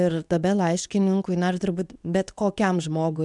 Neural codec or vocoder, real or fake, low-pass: codec, 24 kHz, 0.9 kbps, WavTokenizer, medium speech release version 2; fake; 10.8 kHz